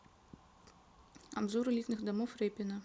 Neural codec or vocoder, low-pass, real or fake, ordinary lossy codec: none; none; real; none